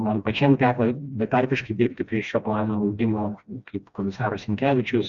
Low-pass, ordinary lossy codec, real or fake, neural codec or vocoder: 7.2 kHz; Opus, 64 kbps; fake; codec, 16 kHz, 1 kbps, FreqCodec, smaller model